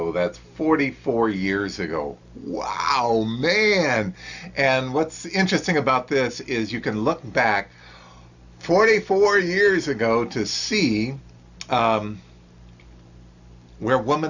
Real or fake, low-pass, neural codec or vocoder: real; 7.2 kHz; none